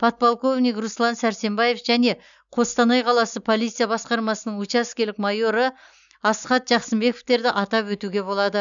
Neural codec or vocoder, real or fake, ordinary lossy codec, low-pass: none; real; none; 7.2 kHz